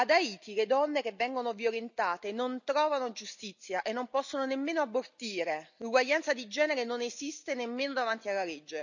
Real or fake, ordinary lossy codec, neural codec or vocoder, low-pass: real; none; none; 7.2 kHz